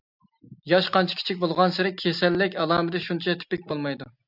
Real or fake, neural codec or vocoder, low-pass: real; none; 5.4 kHz